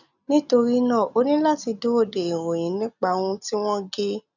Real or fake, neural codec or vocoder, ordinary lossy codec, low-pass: real; none; none; 7.2 kHz